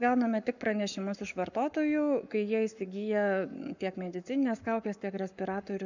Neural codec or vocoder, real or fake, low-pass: codec, 44.1 kHz, 7.8 kbps, Pupu-Codec; fake; 7.2 kHz